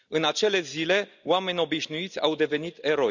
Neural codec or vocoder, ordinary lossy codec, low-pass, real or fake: none; none; 7.2 kHz; real